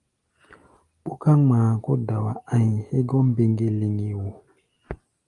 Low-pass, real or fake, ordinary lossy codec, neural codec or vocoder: 10.8 kHz; real; Opus, 32 kbps; none